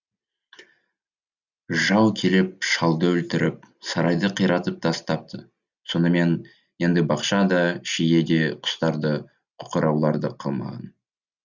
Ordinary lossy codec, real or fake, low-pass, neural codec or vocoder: Opus, 64 kbps; real; 7.2 kHz; none